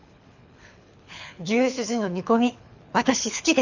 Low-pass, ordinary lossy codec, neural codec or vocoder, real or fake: 7.2 kHz; none; codec, 24 kHz, 6 kbps, HILCodec; fake